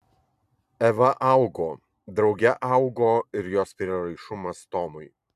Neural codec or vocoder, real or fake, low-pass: vocoder, 44.1 kHz, 128 mel bands every 256 samples, BigVGAN v2; fake; 14.4 kHz